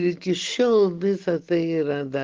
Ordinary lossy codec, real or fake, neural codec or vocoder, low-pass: Opus, 16 kbps; real; none; 7.2 kHz